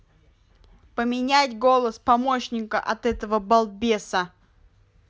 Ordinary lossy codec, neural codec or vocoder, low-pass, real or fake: none; none; none; real